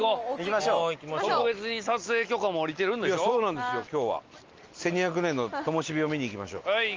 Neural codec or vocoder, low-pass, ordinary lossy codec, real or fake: none; 7.2 kHz; Opus, 24 kbps; real